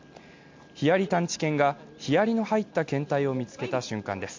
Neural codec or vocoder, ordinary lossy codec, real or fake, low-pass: none; MP3, 48 kbps; real; 7.2 kHz